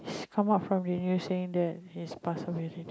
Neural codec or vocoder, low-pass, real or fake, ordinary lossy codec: none; none; real; none